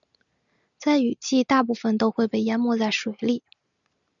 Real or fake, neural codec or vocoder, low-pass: real; none; 7.2 kHz